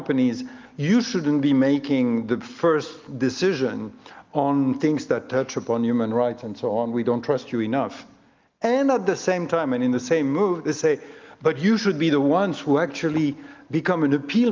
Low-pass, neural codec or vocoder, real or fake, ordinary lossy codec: 7.2 kHz; none; real; Opus, 32 kbps